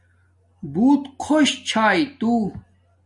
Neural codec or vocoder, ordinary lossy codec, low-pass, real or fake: none; Opus, 64 kbps; 10.8 kHz; real